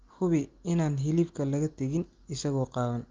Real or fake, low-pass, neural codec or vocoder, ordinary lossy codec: real; 7.2 kHz; none; Opus, 24 kbps